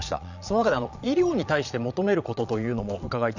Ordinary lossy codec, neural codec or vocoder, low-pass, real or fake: none; vocoder, 22.05 kHz, 80 mel bands, Vocos; 7.2 kHz; fake